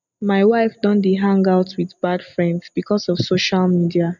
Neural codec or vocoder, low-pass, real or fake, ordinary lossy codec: none; 7.2 kHz; real; none